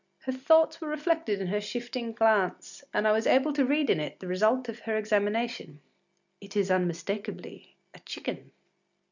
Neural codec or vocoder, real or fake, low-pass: none; real; 7.2 kHz